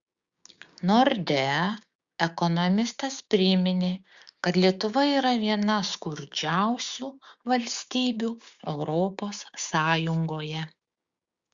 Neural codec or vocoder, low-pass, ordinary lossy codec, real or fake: codec, 16 kHz, 6 kbps, DAC; 7.2 kHz; Opus, 64 kbps; fake